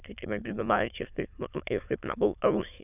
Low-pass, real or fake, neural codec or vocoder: 3.6 kHz; fake; autoencoder, 22.05 kHz, a latent of 192 numbers a frame, VITS, trained on many speakers